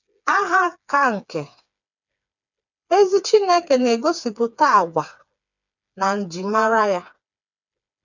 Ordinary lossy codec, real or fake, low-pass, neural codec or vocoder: none; fake; 7.2 kHz; codec, 16 kHz, 4 kbps, FreqCodec, smaller model